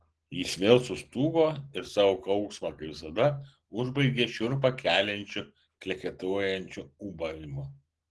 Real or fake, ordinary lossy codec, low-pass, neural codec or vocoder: real; Opus, 16 kbps; 10.8 kHz; none